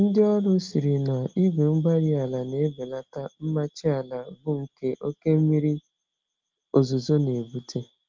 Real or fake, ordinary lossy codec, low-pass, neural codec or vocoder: real; Opus, 24 kbps; 7.2 kHz; none